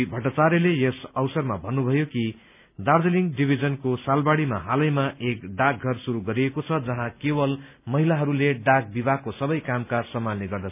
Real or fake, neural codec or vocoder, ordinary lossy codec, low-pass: real; none; none; 3.6 kHz